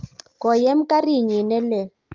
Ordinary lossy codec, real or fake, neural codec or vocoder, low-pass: Opus, 24 kbps; real; none; 7.2 kHz